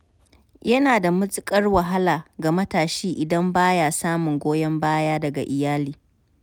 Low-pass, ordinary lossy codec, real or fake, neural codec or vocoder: none; none; real; none